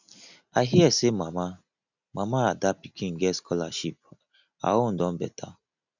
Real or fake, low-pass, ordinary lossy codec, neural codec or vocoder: real; 7.2 kHz; none; none